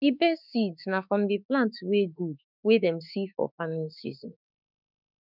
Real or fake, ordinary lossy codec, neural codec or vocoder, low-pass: fake; none; autoencoder, 48 kHz, 32 numbers a frame, DAC-VAE, trained on Japanese speech; 5.4 kHz